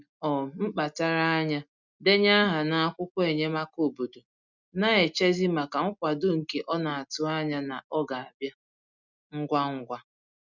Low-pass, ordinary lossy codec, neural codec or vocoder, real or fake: 7.2 kHz; none; none; real